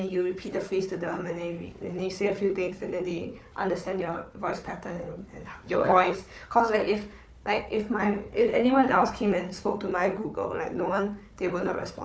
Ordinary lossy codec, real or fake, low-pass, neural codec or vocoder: none; fake; none; codec, 16 kHz, 4 kbps, FunCodec, trained on Chinese and English, 50 frames a second